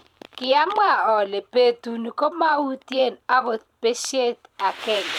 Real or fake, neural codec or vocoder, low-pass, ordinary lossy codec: fake; vocoder, 44.1 kHz, 128 mel bands every 512 samples, BigVGAN v2; 19.8 kHz; none